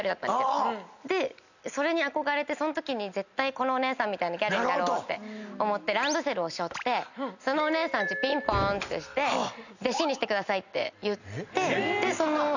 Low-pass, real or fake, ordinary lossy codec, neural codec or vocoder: 7.2 kHz; real; none; none